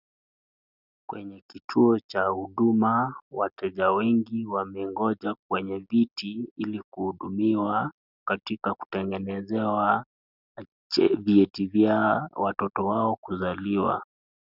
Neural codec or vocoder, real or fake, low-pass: none; real; 5.4 kHz